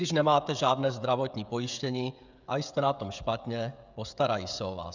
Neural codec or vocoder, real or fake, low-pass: codec, 16 kHz, 16 kbps, FreqCodec, smaller model; fake; 7.2 kHz